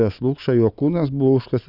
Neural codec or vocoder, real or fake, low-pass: none; real; 5.4 kHz